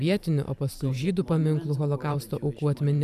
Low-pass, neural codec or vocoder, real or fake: 14.4 kHz; vocoder, 44.1 kHz, 128 mel bands every 512 samples, BigVGAN v2; fake